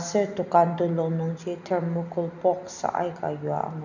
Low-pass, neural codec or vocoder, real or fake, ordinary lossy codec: 7.2 kHz; none; real; none